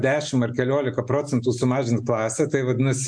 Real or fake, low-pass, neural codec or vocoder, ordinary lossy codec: real; 9.9 kHz; none; MP3, 64 kbps